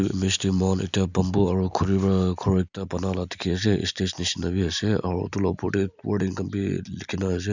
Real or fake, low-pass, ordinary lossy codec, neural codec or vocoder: real; 7.2 kHz; none; none